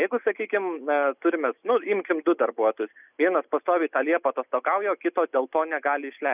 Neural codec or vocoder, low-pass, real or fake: none; 3.6 kHz; real